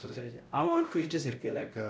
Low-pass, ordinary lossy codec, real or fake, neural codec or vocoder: none; none; fake; codec, 16 kHz, 0.5 kbps, X-Codec, WavLM features, trained on Multilingual LibriSpeech